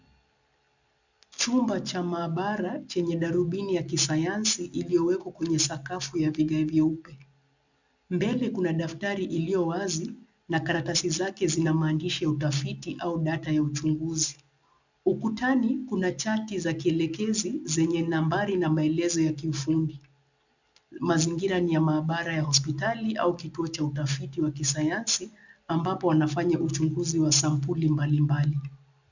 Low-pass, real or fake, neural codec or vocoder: 7.2 kHz; real; none